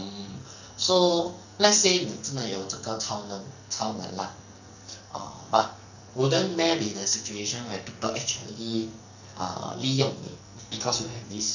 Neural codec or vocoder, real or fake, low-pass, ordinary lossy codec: codec, 44.1 kHz, 2.6 kbps, SNAC; fake; 7.2 kHz; none